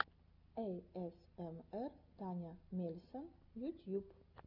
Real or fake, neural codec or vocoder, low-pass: real; none; 5.4 kHz